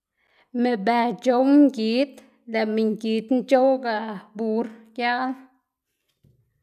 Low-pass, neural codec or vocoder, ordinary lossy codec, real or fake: 14.4 kHz; none; none; real